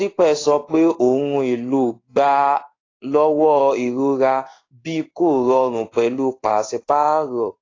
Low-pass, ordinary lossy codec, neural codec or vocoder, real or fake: 7.2 kHz; AAC, 32 kbps; codec, 16 kHz in and 24 kHz out, 1 kbps, XY-Tokenizer; fake